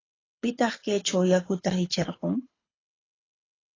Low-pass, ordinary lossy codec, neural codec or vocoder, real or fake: 7.2 kHz; AAC, 32 kbps; codec, 24 kHz, 6 kbps, HILCodec; fake